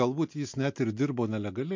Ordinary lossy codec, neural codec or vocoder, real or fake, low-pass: MP3, 48 kbps; none; real; 7.2 kHz